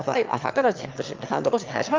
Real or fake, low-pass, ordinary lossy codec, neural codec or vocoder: fake; 7.2 kHz; Opus, 32 kbps; autoencoder, 22.05 kHz, a latent of 192 numbers a frame, VITS, trained on one speaker